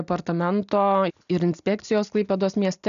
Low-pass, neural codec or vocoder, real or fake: 7.2 kHz; none; real